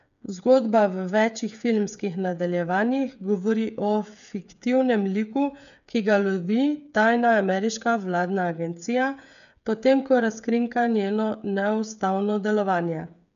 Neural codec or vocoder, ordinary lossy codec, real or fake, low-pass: codec, 16 kHz, 8 kbps, FreqCodec, smaller model; MP3, 96 kbps; fake; 7.2 kHz